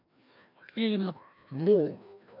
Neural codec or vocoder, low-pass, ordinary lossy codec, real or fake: codec, 16 kHz, 1 kbps, FreqCodec, larger model; 5.4 kHz; MP3, 48 kbps; fake